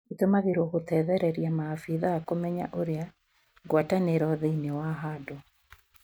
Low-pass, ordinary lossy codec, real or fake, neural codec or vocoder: none; none; real; none